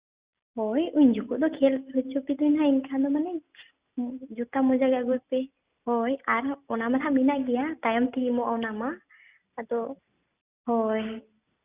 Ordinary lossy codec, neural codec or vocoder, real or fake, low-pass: Opus, 64 kbps; none; real; 3.6 kHz